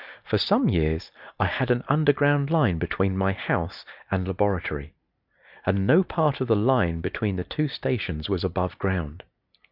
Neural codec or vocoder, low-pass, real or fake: none; 5.4 kHz; real